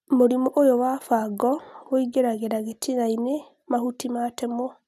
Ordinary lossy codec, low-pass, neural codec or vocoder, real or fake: none; 14.4 kHz; none; real